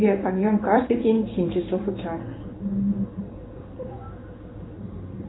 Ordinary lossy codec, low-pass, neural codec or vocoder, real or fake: AAC, 16 kbps; 7.2 kHz; codec, 16 kHz in and 24 kHz out, 1 kbps, XY-Tokenizer; fake